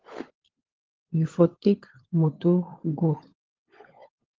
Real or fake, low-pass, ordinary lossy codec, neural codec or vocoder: fake; 7.2 kHz; Opus, 16 kbps; codec, 16 kHz, 16 kbps, FunCodec, trained on LibriTTS, 50 frames a second